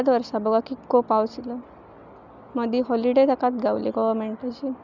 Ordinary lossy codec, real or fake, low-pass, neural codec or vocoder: none; real; 7.2 kHz; none